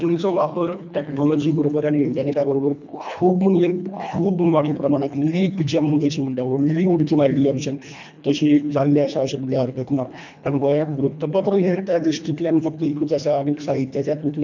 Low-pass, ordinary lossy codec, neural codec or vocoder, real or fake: 7.2 kHz; none; codec, 24 kHz, 1.5 kbps, HILCodec; fake